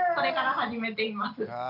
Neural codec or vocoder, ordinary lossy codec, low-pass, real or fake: none; none; 5.4 kHz; real